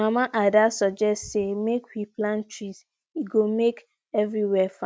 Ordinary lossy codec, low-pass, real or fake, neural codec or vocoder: none; none; real; none